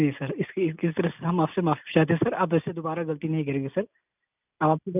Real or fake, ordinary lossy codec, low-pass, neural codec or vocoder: real; none; 3.6 kHz; none